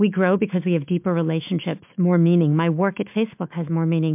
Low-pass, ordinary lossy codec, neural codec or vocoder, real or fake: 3.6 kHz; MP3, 32 kbps; autoencoder, 48 kHz, 128 numbers a frame, DAC-VAE, trained on Japanese speech; fake